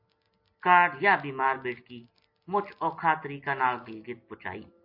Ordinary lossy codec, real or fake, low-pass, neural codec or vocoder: MP3, 32 kbps; real; 5.4 kHz; none